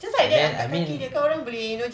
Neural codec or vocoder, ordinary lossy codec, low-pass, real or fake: none; none; none; real